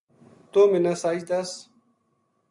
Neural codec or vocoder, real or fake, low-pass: none; real; 10.8 kHz